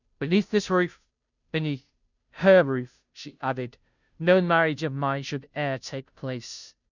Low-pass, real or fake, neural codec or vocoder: 7.2 kHz; fake; codec, 16 kHz, 0.5 kbps, FunCodec, trained on Chinese and English, 25 frames a second